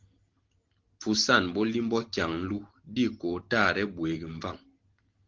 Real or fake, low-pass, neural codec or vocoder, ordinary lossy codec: real; 7.2 kHz; none; Opus, 16 kbps